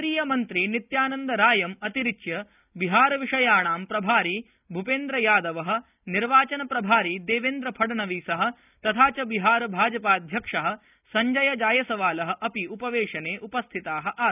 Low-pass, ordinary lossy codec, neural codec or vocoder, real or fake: 3.6 kHz; none; none; real